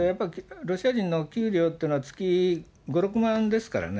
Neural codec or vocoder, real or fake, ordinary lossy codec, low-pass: none; real; none; none